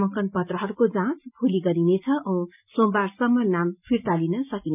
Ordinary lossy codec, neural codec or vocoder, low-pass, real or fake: none; none; 3.6 kHz; real